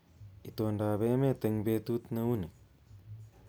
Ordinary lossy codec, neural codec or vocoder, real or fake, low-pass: none; none; real; none